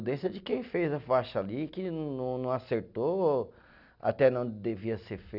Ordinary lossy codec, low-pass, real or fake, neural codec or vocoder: none; 5.4 kHz; real; none